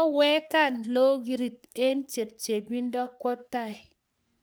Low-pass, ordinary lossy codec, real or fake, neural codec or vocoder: none; none; fake; codec, 44.1 kHz, 3.4 kbps, Pupu-Codec